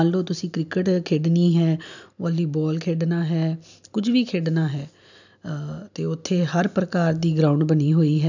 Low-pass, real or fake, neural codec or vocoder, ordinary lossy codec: 7.2 kHz; real; none; none